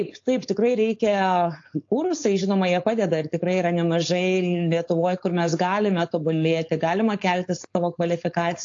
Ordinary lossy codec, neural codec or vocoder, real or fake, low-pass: AAC, 48 kbps; codec, 16 kHz, 4.8 kbps, FACodec; fake; 7.2 kHz